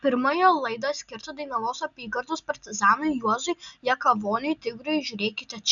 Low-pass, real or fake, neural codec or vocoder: 7.2 kHz; real; none